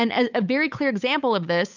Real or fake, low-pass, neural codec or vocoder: fake; 7.2 kHz; codec, 16 kHz, 8 kbps, FunCodec, trained on Chinese and English, 25 frames a second